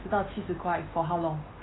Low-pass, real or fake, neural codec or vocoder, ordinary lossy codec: 7.2 kHz; real; none; AAC, 16 kbps